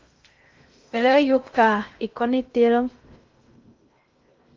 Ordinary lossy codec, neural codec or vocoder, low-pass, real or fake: Opus, 32 kbps; codec, 16 kHz in and 24 kHz out, 0.6 kbps, FocalCodec, streaming, 2048 codes; 7.2 kHz; fake